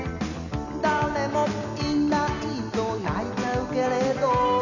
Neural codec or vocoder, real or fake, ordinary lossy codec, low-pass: none; real; none; 7.2 kHz